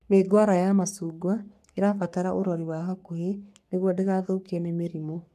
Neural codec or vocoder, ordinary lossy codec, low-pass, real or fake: codec, 44.1 kHz, 3.4 kbps, Pupu-Codec; none; 14.4 kHz; fake